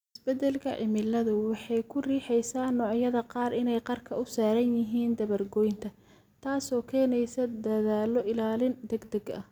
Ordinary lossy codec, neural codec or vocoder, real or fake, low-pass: none; none; real; 19.8 kHz